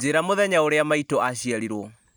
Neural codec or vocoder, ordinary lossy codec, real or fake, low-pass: none; none; real; none